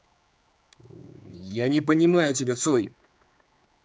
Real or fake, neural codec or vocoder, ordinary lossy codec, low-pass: fake; codec, 16 kHz, 4 kbps, X-Codec, HuBERT features, trained on general audio; none; none